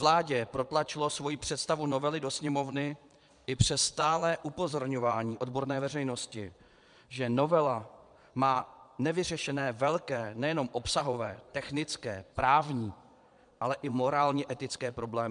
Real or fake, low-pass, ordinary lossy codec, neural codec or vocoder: fake; 9.9 kHz; MP3, 96 kbps; vocoder, 22.05 kHz, 80 mel bands, Vocos